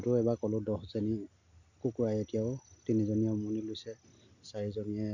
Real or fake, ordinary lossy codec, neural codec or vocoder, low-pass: real; none; none; 7.2 kHz